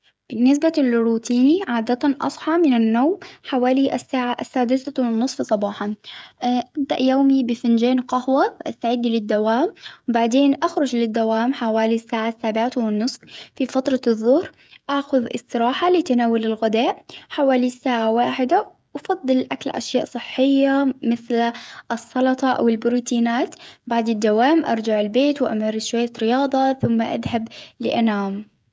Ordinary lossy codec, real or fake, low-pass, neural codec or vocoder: none; fake; none; codec, 16 kHz, 16 kbps, FreqCodec, smaller model